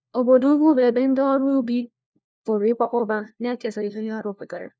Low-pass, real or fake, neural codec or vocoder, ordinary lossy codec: none; fake; codec, 16 kHz, 1 kbps, FunCodec, trained on LibriTTS, 50 frames a second; none